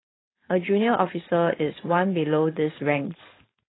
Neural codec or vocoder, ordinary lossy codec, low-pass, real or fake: codec, 16 kHz, 4.8 kbps, FACodec; AAC, 16 kbps; 7.2 kHz; fake